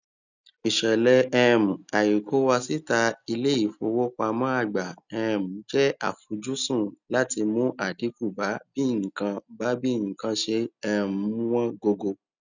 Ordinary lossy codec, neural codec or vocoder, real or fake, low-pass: none; none; real; 7.2 kHz